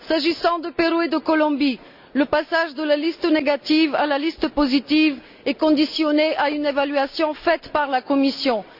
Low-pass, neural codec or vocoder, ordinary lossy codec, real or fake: 5.4 kHz; none; none; real